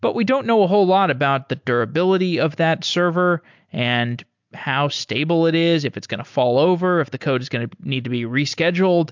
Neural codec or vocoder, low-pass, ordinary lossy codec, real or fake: none; 7.2 kHz; MP3, 64 kbps; real